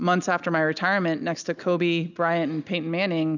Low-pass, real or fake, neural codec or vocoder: 7.2 kHz; real; none